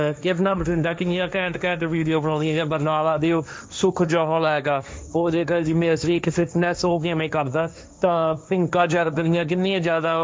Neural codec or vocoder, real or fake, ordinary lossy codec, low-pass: codec, 16 kHz, 1.1 kbps, Voila-Tokenizer; fake; none; none